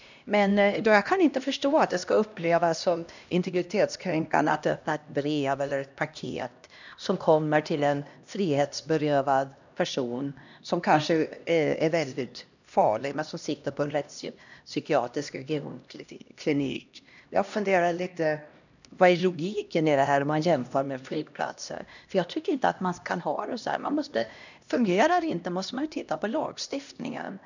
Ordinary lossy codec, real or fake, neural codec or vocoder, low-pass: none; fake; codec, 16 kHz, 1 kbps, X-Codec, HuBERT features, trained on LibriSpeech; 7.2 kHz